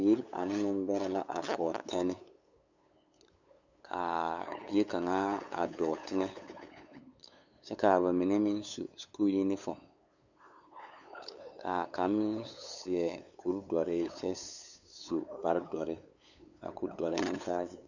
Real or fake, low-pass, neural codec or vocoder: fake; 7.2 kHz; codec, 16 kHz, 8 kbps, FunCodec, trained on LibriTTS, 25 frames a second